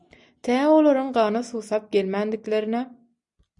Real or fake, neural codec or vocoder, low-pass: real; none; 10.8 kHz